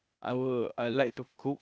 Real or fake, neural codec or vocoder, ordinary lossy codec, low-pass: fake; codec, 16 kHz, 0.8 kbps, ZipCodec; none; none